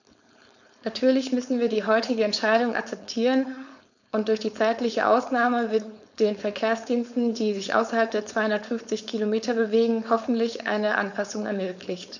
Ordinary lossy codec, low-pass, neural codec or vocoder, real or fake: none; 7.2 kHz; codec, 16 kHz, 4.8 kbps, FACodec; fake